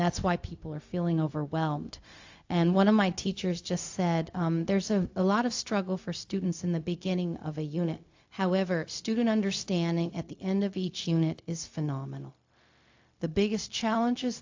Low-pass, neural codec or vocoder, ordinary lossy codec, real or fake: 7.2 kHz; codec, 16 kHz, 0.4 kbps, LongCat-Audio-Codec; AAC, 48 kbps; fake